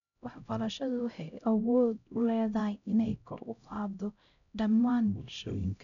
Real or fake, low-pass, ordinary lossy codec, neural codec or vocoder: fake; 7.2 kHz; none; codec, 16 kHz, 0.5 kbps, X-Codec, HuBERT features, trained on LibriSpeech